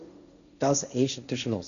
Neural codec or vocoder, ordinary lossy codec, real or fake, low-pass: codec, 16 kHz, 1.1 kbps, Voila-Tokenizer; none; fake; 7.2 kHz